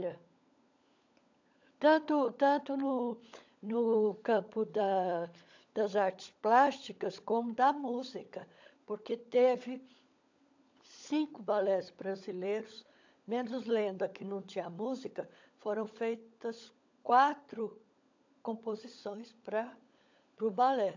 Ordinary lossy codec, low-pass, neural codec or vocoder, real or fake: AAC, 48 kbps; 7.2 kHz; codec, 16 kHz, 16 kbps, FunCodec, trained on LibriTTS, 50 frames a second; fake